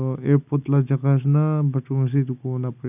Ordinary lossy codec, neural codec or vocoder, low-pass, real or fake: none; none; 3.6 kHz; real